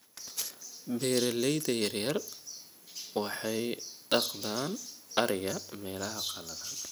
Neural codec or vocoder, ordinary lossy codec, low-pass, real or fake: none; none; none; real